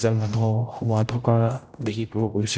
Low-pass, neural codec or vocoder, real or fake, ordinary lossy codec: none; codec, 16 kHz, 0.5 kbps, X-Codec, HuBERT features, trained on general audio; fake; none